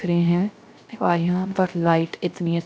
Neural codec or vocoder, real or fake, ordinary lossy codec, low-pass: codec, 16 kHz, 0.3 kbps, FocalCodec; fake; none; none